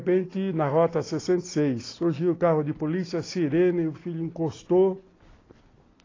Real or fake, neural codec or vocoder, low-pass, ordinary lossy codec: real; none; 7.2 kHz; AAC, 32 kbps